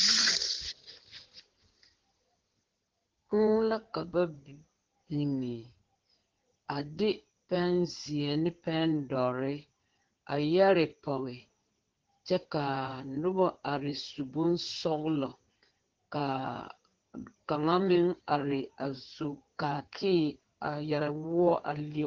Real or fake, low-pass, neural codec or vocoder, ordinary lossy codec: fake; 7.2 kHz; codec, 16 kHz in and 24 kHz out, 2.2 kbps, FireRedTTS-2 codec; Opus, 16 kbps